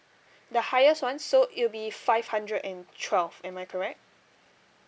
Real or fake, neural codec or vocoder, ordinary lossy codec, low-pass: real; none; none; none